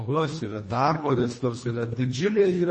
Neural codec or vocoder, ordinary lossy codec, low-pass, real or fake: codec, 24 kHz, 1.5 kbps, HILCodec; MP3, 32 kbps; 10.8 kHz; fake